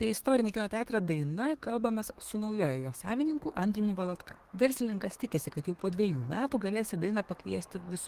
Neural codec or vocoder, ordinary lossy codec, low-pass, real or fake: codec, 32 kHz, 1.9 kbps, SNAC; Opus, 24 kbps; 14.4 kHz; fake